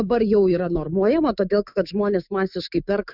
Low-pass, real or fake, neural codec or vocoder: 5.4 kHz; fake; autoencoder, 48 kHz, 128 numbers a frame, DAC-VAE, trained on Japanese speech